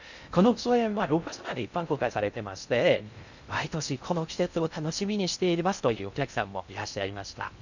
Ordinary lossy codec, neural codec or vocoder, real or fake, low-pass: none; codec, 16 kHz in and 24 kHz out, 0.6 kbps, FocalCodec, streaming, 2048 codes; fake; 7.2 kHz